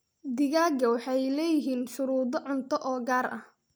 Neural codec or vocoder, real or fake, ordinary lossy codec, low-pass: none; real; none; none